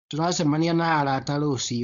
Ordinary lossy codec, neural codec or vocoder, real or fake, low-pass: none; codec, 16 kHz, 4.8 kbps, FACodec; fake; 7.2 kHz